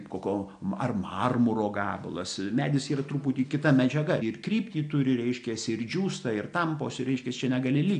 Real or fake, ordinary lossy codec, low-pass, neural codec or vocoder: real; AAC, 96 kbps; 9.9 kHz; none